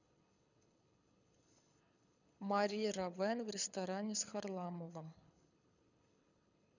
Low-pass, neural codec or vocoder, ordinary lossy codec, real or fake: 7.2 kHz; codec, 24 kHz, 6 kbps, HILCodec; none; fake